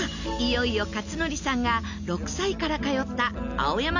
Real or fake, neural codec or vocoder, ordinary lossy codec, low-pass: real; none; none; 7.2 kHz